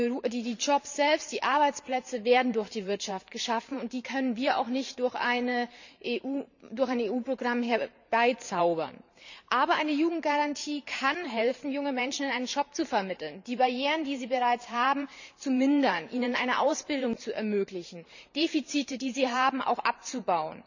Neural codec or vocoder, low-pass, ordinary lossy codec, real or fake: vocoder, 44.1 kHz, 128 mel bands every 512 samples, BigVGAN v2; 7.2 kHz; none; fake